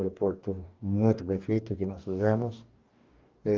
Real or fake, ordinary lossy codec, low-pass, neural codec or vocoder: fake; Opus, 32 kbps; 7.2 kHz; codec, 44.1 kHz, 2.6 kbps, DAC